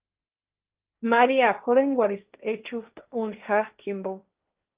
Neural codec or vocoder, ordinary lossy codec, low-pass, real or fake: codec, 16 kHz, 1.1 kbps, Voila-Tokenizer; Opus, 24 kbps; 3.6 kHz; fake